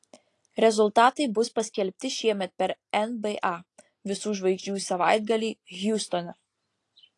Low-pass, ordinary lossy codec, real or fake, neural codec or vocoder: 10.8 kHz; AAC, 48 kbps; real; none